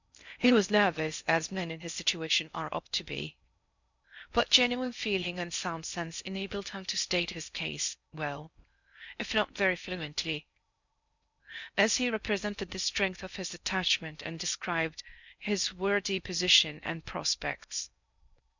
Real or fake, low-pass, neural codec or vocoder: fake; 7.2 kHz; codec, 16 kHz in and 24 kHz out, 0.6 kbps, FocalCodec, streaming, 4096 codes